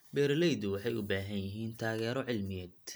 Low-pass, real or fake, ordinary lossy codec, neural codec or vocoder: none; real; none; none